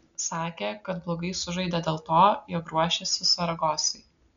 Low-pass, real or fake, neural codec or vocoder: 7.2 kHz; real; none